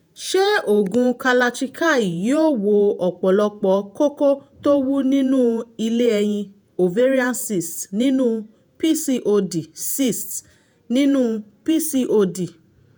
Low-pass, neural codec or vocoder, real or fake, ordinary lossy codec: none; vocoder, 48 kHz, 128 mel bands, Vocos; fake; none